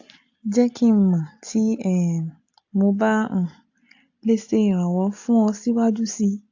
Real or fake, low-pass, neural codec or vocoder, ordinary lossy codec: real; 7.2 kHz; none; AAC, 48 kbps